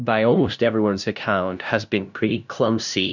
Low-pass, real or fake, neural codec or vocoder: 7.2 kHz; fake; codec, 16 kHz, 0.5 kbps, FunCodec, trained on LibriTTS, 25 frames a second